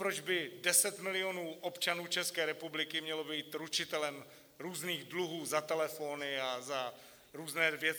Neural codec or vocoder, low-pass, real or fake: none; 19.8 kHz; real